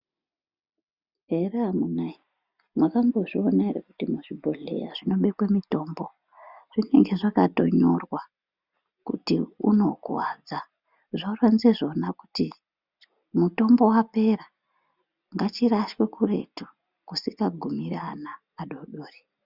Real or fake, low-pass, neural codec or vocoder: real; 5.4 kHz; none